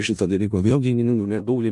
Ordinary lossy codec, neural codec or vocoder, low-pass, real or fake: MP3, 64 kbps; codec, 16 kHz in and 24 kHz out, 0.4 kbps, LongCat-Audio-Codec, four codebook decoder; 10.8 kHz; fake